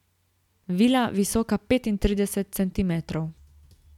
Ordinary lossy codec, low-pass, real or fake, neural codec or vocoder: none; 19.8 kHz; real; none